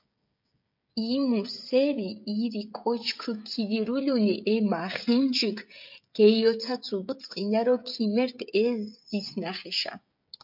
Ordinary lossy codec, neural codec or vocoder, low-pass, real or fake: MP3, 48 kbps; codec, 16 kHz, 16 kbps, FreqCodec, smaller model; 5.4 kHz; fake